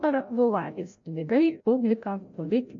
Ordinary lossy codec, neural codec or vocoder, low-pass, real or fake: MP3, 48 kbps; codec, 16 kHz, 0.5 kbps, FreqCodec, larger model; 7.2 kHz; fake